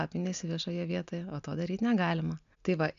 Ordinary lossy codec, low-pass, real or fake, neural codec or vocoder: MP3, 64 kbps; 7.2 kHz; real; none